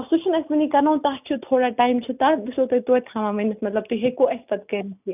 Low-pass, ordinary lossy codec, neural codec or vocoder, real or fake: 3.6 kHz; none; none; real